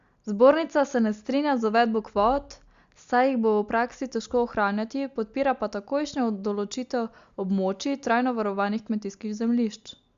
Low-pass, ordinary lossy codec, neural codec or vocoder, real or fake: 7.2 kHz; Opus, 64 kbps; none; real